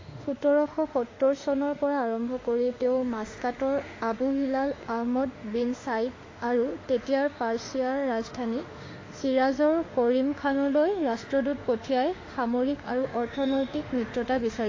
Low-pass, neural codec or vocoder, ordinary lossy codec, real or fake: 7.2 kHz; autoencoder, 48 kHz, 32 numbers a frame, DAC-VAE, trained on Japanese speech; AAC, 32 kbps; fake